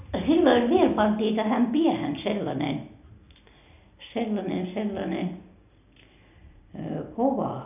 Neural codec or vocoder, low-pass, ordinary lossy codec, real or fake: none; 3.6 kHz; none; real